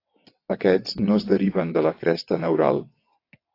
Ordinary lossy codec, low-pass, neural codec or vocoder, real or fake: AAC, 32 kbps; 5.4 kHz; vocoder, 22.05 kHz, 80 mel bands, WaveNeXt; fake